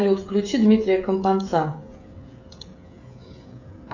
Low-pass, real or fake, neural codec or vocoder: 7.2 kHz; fake; codec, 16 kHz, 16 kbps, FreqCodec, smaller model